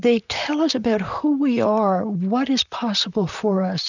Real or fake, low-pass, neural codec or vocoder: fake; 7.2 kHz; vocoder, 44.1 kHz, 128 mel bands, Pupu-Vocoder